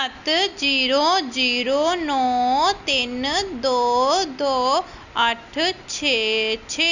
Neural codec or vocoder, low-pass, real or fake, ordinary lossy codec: none; 7.2 kHz; real; Opus, 64 kbps